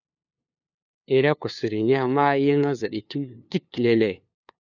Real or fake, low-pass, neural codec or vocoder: fake; 7.2 kHz; codec, 16 kHz, 2 kbps, FunCodec, trained on LibriTTS, 25 frames a second